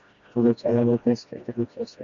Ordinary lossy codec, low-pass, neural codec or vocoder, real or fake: Opus, 64 kbps; 7.2 kHz; codec, 16 kHz, 1 kbps, FreqCodec, smaller model; fake